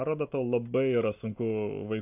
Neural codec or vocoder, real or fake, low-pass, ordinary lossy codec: none; real; 3.6 kHz; AAC, 32 kbps